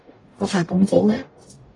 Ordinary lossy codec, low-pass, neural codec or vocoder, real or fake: AAC, 32 kbps; 10.8 kHz; codec, 44.1 kHz, 0.9 kbps, DAC; fake